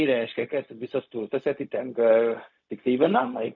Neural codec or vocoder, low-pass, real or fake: codec, 16 kHz, 0.4 kbps, LongCat-Audio-Codec; 7.2 kHz; fake